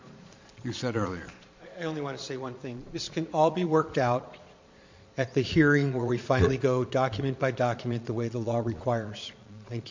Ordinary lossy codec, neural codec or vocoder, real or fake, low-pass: MP3, 48 kbps; vocoder, 22.05 kHz, 80 mel bands, Vocos; fake; 7.2 kHz